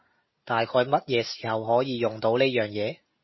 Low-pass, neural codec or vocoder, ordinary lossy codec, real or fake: 7.2 kHz; none; MP3, 24 kbps; real